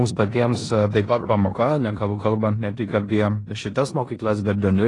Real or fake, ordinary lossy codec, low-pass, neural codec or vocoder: fake; AAC, 32 kbps; 10.8 kHz; codec, 16 kHz in and 24 kHz out, 0.9 kbps, LongCat-Audio-Codec, four codebook decoder